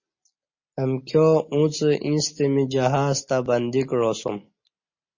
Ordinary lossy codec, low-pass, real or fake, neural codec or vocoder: MP3, 32 kbps; 7.2 kHz; real; none